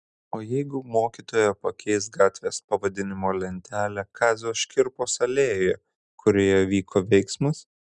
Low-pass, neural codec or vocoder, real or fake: 10.8 kHz; none; real